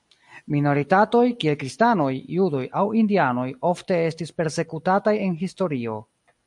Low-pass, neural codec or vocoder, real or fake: 10.8 kHz; none; real